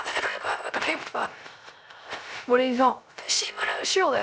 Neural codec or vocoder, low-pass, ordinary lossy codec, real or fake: codec, 16 kHz, 0.3 kbps, FocalCodec; none; none; fake